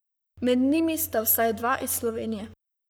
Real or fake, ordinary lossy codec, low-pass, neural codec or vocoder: fake; none; none; codec, 44.1 kHz, 7.8 kbps, Pupu-Codec